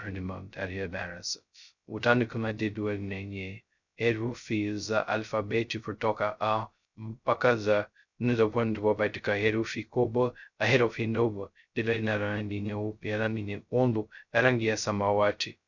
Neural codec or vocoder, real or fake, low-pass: codec, 16 kHz, 0.2 kbps, FocalCodec; fake; 7.2 kHz